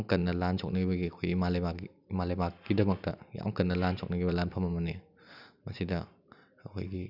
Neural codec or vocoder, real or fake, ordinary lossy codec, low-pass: none; real; none; 5.4 kHz